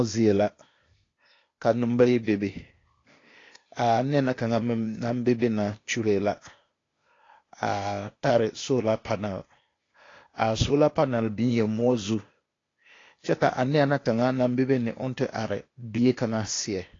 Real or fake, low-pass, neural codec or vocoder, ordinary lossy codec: fake; 7.2 kHz; codec, 16 kHz, 0.8 kbps, ZipCodec; AAC, 32 kbps